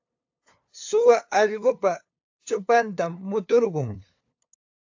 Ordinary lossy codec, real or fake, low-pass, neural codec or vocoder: AAC, 48 kbps; fake; 7.2 kHz; codec, 16 kHz, 2 kbps, FunCodec, trained on LibriTTS, 25 frames a second